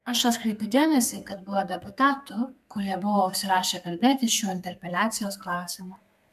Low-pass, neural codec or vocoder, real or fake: 14.4 kHz; codec, 32 kHz, 1.9 kbps, SNAC; fake